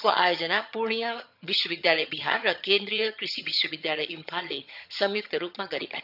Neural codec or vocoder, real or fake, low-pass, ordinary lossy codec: vocoder, 22.05 kHz, 80 mel bands, HiFi-GAN; fake; 5.4 kHz; none